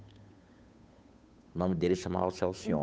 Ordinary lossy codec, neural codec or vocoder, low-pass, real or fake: none; codec, 16 kHz, 8 kbps, FunCodec, trained on Chinese and English, 25 frames a second; none; fake